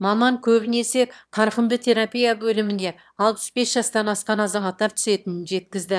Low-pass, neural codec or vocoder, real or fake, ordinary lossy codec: none; autoencoder, 22.05 kHz, a latent of 192 numbers a frame, VITS, trained on one speaker; fake; none